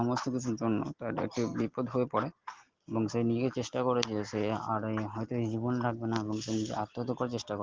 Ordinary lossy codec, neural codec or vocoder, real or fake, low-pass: Opus, 16 kbps; none; real; 7.2 kHz